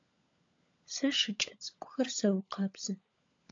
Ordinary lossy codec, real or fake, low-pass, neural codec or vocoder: AAC, 48 kbps; fake; 7.2 kHz; codec, 16 kHz, 16 kbps, FunCodec, trained on LibriTTS, 50 frames a second